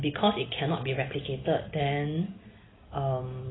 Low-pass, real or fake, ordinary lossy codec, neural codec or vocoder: 7.2 kHz; real; AAC, 16 kbps; none